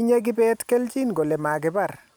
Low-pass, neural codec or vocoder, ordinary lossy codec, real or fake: none; none; none; real